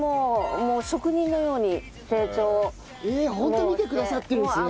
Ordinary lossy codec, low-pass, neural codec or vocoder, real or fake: none; none; none; real